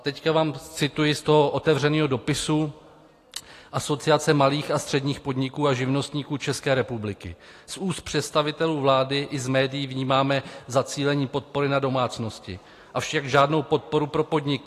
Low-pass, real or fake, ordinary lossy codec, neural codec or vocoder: 14.4 kHz; real; AAC, 48 kbps; none